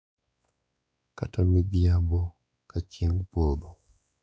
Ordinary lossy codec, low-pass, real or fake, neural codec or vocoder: none; none; fake; codec, 16 kHz, 4 kbps, X-Codec, WavLM features, trained on Multilingual LibriSpeech